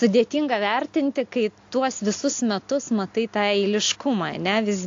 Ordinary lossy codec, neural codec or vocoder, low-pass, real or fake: AAC, 48 kbps; none; 7.2 kHz; real